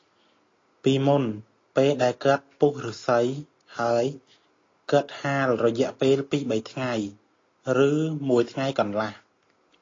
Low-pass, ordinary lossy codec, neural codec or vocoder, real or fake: 7.2 kHz; AAC, 32 kbps; none; real